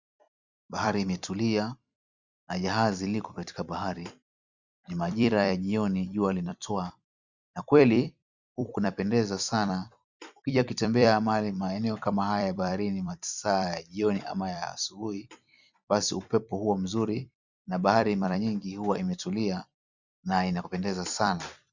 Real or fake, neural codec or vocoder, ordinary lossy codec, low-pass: fake; vocoder, 44.1 kHz, 128 mel bands every 256 samples, BigVGAN v2; Opus, 64 kbps; 7.2 kHz